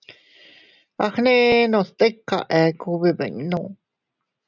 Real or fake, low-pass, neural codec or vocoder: real; 7.2 kHz; none